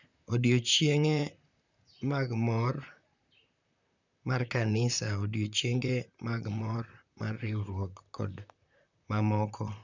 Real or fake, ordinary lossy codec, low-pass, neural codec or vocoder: fake; none; 7.2 kHz; vocoder, 44.1 kHz, 128 mel bands, Pupu-Vocoder